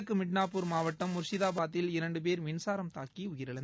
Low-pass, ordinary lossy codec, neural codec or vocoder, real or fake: none; none; none; real